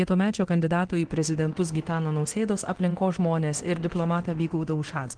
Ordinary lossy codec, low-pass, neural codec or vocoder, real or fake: Opus, 16 kbps; 9.9 kHz; codec, 24 kHz, 0.9 kbps, DualCodec; fake